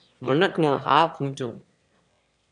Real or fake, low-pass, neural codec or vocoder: fake; 9.9 kHz; autoencoder, 22.05 kHz, a latent of 192 numbers a frame, VITS, trained on one speaker